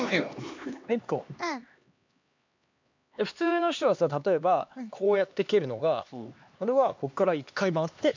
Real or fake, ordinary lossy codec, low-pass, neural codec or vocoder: fake; MP3, 64 kbps; 7.2 kHz; codec, 16 kHz, 2 kbps, X-Codec, HuBERT features, trained on LibriSpeech